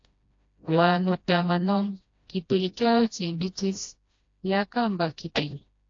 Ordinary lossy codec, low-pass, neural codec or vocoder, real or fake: AAC, 48 kbps; 7.2 kHz; codec, 16 kHz, 1 kbps, FreqCodec, smaller model; fake